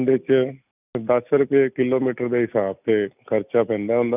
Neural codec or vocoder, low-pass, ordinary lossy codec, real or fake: none; 3.6 kHz; none; real